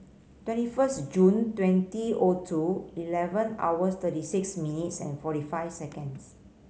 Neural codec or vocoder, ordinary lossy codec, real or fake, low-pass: none; none; real; none